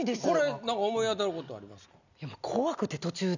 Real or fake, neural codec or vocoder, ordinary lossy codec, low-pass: real; none; none; 7.2 kHz